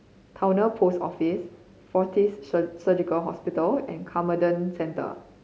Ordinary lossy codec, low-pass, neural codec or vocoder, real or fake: none; none; none; real